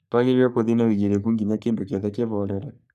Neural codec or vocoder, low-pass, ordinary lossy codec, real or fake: codec, 44.1 kHz, 3.4 kbps, Pupu-Codec; 14.4 kHz; none; fake